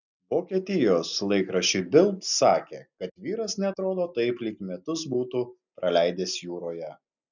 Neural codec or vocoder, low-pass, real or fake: none; 7.2 kHz; real